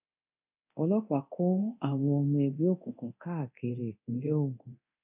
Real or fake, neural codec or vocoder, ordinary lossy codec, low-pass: fake; codec, 24 kHz, 0.9 kbps, DualCodec; none; 3.6 kHz